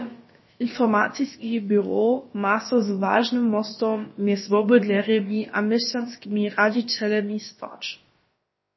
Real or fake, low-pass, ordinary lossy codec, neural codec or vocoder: fake; 7.2 kHz; MP3, 24 kbps; codec, 16 kHz, about 1 kbps, DyCAST, with the encoder's durations